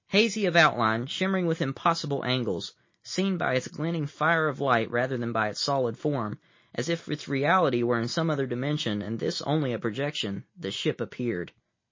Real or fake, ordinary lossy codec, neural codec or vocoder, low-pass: real; MP3, 32 kbps; none; 7.2 kHz